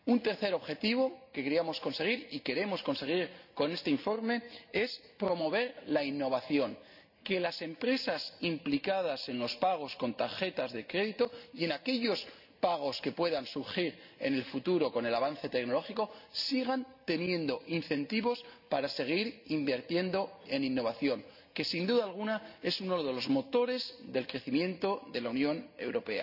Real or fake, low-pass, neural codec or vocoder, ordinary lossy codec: real; 5.4 kHz; none; none